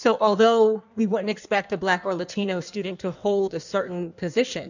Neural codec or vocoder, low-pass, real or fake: codec, 16 kHz in and 24 kHz out, 1.1 kbps, FireRedTTS-2 codec; 7.2 kHz; fake